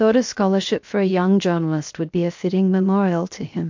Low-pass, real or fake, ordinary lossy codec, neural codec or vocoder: 7.2 kHz; fake; MP3, 48 kbps; codec, 16 kHz, 0.7 kbps, FocalCodec